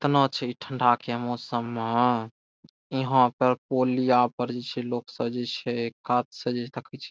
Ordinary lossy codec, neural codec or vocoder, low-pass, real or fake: none; none; none; real